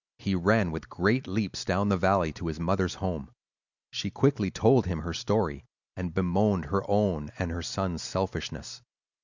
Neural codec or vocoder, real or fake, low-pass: none; real; 7.2 kHz